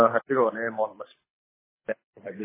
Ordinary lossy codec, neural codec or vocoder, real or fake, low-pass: MP3, 16 kbps; codec, 24 kHz, 6 kbps, HILCodec; fake; 3.6 kHz